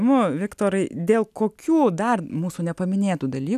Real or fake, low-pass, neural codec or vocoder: real; 14.4 kHz; none